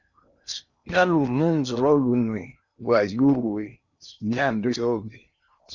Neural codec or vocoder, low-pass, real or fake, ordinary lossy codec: codec, 16 kHz in and 24 kHz out, 0.8 kbps, FocalCodec, streaming, 65536 codes; 7.2 kHz; fake; Opus, 64 kbps